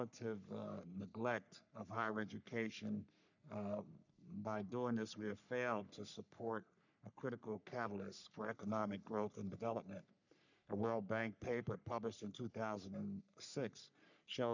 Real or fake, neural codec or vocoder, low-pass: fake; codec, 44.1 kHz, 3.4 kbps, Pupu-Codec; 7.2 kHz